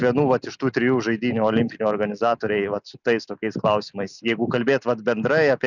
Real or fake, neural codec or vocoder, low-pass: real; none; 7.2 kHz